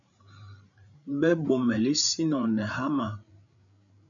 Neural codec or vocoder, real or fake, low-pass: codec, 16 kHz, 8 kbps, FreqCodec, larger model; fake; 7.2 kHz